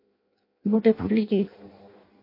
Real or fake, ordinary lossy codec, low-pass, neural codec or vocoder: fake; MP3, 32 kbps; 5.4 kHz; codec, 16 kHz in and 24 kHz out, 0.6 kbps, FireRedTTS-2 codec